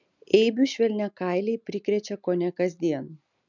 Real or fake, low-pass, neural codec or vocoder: real; 7.2 kHz; none